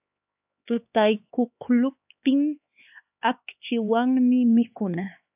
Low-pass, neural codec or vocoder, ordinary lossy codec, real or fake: 3.6 kHz; codec, 16 kHz, 4 kbps, X-Codec, HuBERT features, trained on LibriSpeech; AAC, 32 kbps; fake